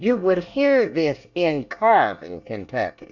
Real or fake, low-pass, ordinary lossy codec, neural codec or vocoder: fake; 7.2 kHz; Opus, 64 kbps; codec, 24 kHz, 1 kbps, SNAC